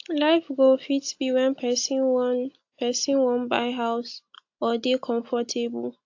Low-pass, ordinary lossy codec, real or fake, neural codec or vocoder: 7.2 kHz; AAC, 48 kbps; real; none